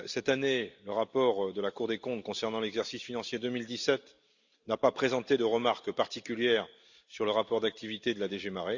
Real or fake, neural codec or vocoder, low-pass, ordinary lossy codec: real; none; 7.2 kHz; Opus, 64 kbps